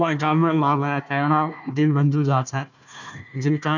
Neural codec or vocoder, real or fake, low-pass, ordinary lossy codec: codec, 16 kHz, 1 kbps, FunCodec, trained on Chinese and English, 50 frames a second; fake; 7.2 kHz; none